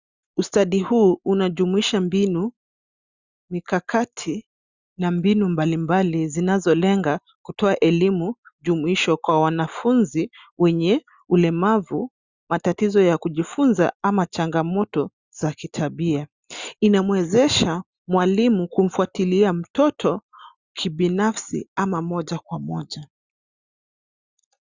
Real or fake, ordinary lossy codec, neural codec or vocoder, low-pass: real; Opus, 64 kbps; none; 7.2 kHz